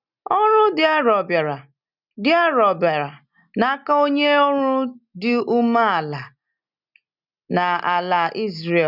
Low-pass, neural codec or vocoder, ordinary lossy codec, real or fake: 5.4 kHz; none; none; real